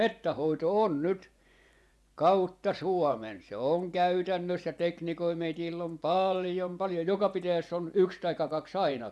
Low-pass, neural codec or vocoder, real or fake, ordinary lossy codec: none; vocoder, 24 kHz, 100 mel bands, Vocos; fake; none